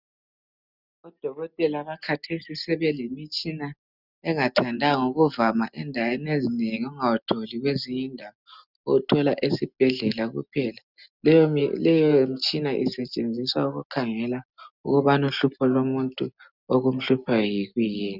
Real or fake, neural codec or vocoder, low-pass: real; none; 5.4 kHz